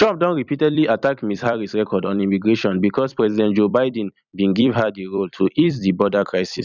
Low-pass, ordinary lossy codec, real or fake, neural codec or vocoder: 7.2 kHz; none; fake; vocoder, 44.1 kHz, 128 mel bands every 256 samples, BigVGAN v2